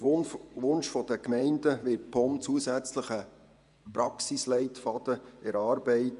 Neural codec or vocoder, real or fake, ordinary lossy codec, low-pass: vocoder, 24 kHz, 100 mel bands, Vocos; fake; none; 10.8 kHz